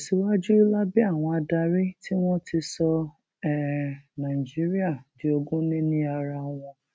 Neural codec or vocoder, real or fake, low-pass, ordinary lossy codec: none; real; none; none